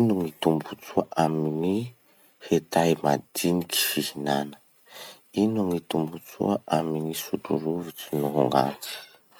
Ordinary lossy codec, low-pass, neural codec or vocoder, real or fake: none; none; none; real